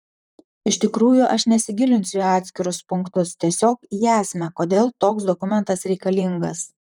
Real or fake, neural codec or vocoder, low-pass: fake; vocoder, 44.1 kHz, 128 mel bands, Pupu-Vocoder; 19.8 kHz